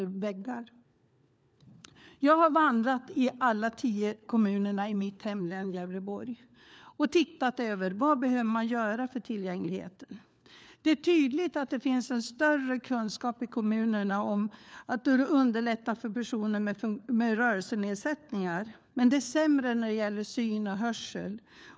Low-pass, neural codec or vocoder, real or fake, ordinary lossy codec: none; codec, 16 kHz, 4 kbps, FunCodec, trained on LibriTTS, 50 frames a second; fake; none